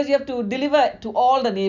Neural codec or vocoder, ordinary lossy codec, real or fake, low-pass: none; none; real; 7.2 kHz